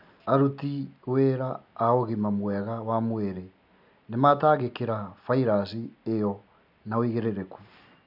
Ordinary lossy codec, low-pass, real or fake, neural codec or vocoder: none; 5.4 kHz; real; none